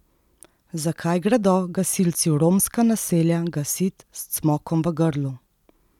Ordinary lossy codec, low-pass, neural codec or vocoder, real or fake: none; 19.8 kHz; none; real